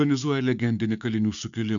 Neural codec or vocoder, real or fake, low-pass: codec, 16 kHz, 6 kbps, DAC; fake; 7.2 kHz